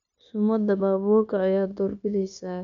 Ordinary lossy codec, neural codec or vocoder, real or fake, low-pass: none; codec, 16 kHz, 0.9 kbps, LongCat-Audio-Codec; fake; 7.2 kHz